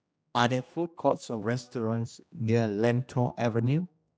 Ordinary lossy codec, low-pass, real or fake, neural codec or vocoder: none; none; fake; codec, 16 kHz, 1 kbps, X-Codec, HuBERT features, trained on general audio